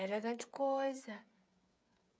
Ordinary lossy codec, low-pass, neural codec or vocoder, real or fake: none; none; codec, 16 kHz, 8 kbps, FreqCodec, smaller model; fake